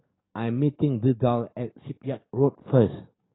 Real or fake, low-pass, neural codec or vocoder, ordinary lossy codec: fake; 7.2 kHz; codec, 44.1 kHz, 7.8 kbps, DAC; AAC, 16 kbps